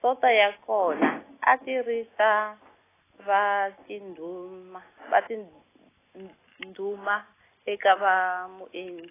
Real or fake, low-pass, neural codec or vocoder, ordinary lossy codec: real; 3.6 kHz; none; AAC, 16 kbps